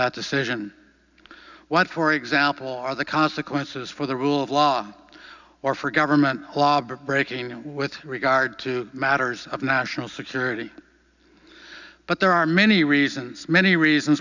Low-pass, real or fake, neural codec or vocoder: 7.2 kHz; real; none